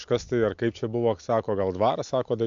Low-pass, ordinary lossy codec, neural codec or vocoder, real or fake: 7.2 kHz; AAC, 64 kbps; none; real